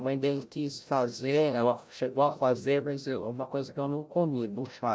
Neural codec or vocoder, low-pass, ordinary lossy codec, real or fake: codec, 16 kHz, 0.5 kbps, FreqCodec, larger model; none; none; fake